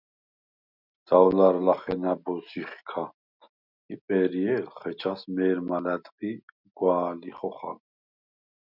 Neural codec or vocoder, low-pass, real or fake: none; 5.4 kHz; real